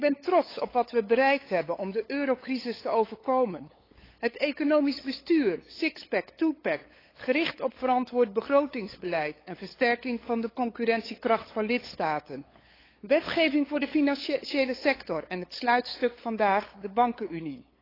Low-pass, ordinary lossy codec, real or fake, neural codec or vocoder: 5.4 kHz; AAC, 24 kbps; fake; codec, 16 kHz, 8 kbps, FunCodec, trained on LibriTTS, 25 frames a second